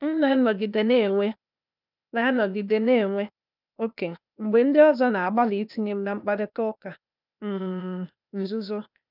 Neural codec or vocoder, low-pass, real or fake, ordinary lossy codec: codec, 16 kHz, 0.8 kbps, ZipCodec; 5.4 kHz; fake; none